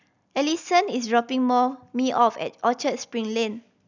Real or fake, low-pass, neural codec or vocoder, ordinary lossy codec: real; 7.2 kHz; none; none